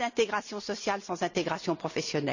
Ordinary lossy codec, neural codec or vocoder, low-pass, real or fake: none; none; 7.2 kHz; real